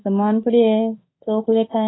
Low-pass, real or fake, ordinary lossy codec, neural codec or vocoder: 7.2 kHz; fake; AAC, 16 kbps; autoencoder, 48 kHz, 32 numbers a frame, DAC-VAE, trained on Japanese speech